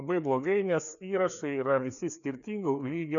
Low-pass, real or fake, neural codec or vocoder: 7.2 kHz; fake; codec, 16 kHz, 2 kbps, FreqCodec, larger model